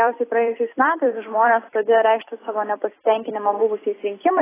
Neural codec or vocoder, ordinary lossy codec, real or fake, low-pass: vocoder, 44.1 kHz, 128 mel bands every 256 samples, BigVGAN v2; AAC, 16 kbps; fake; 3.6 kHz